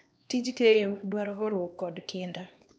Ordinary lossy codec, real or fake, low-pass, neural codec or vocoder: none; fake; none; codec, 16 kHz, 2 kbps, X-Codec, HuBERT features, trained on LibriSpeech